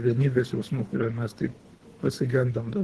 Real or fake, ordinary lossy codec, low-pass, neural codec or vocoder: fake; Opus, 16 kbps; 10.8 kHz; codec, 24 kHz, 3 kbps, HILCodec